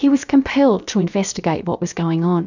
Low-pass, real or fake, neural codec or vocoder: 7.2 kHz; fake; codec, 16 kHz, about 1 kbps, DyCAST, with the encoder's durations